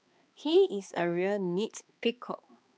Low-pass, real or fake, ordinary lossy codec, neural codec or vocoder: none; fake; none; codec, 16 kHz, 2 kbps, X-Codec, HuBERT features, trained on balanced general audio